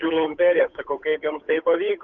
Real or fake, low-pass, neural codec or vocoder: fake; 7.2 kHz; codec, 16 kHz, 8 kbps, FunCodec, trained on Chinese and English, 25 frames a second